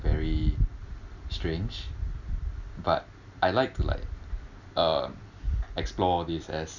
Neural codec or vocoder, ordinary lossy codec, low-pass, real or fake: none; none; 7.2 kHz; real